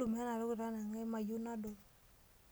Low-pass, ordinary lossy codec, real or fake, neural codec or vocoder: none; none; real; none